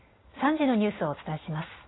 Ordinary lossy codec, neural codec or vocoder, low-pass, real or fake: AAC, 16 kbps; none; 7.2 kHz; real